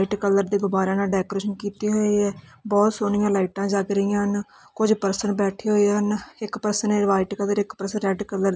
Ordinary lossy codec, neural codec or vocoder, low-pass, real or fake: none; none; none; real